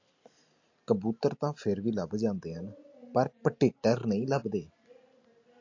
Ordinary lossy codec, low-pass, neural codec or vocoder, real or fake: AAC, 48 kbps; 7.2 kHz; none; real